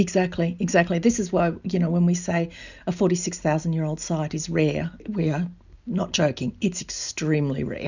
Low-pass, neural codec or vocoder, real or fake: 7.2 kHz; none; real